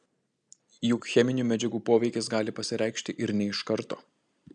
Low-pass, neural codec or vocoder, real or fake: 9.9 kHz; none; real